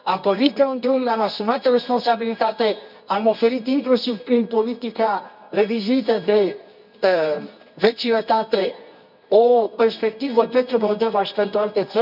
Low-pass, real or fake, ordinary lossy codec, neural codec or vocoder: 5.4 kHz; fake; none; codec, 24 kHz, 0.9 kbps, WavTokenizer, medium music audio release